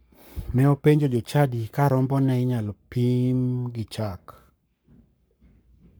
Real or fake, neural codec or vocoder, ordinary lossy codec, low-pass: fake; codec, 44.1 kHz, 7.8 kbps, Pupu-Codec; none; none